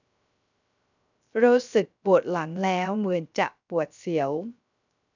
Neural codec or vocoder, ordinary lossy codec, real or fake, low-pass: codec, 16 kHz, 0.3 kbps, FocalCodec; none; fake; 7.2 kHz